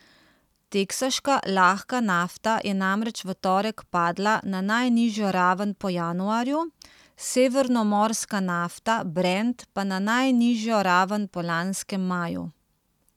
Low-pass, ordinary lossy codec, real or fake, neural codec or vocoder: 19.8 kHz; none; real; none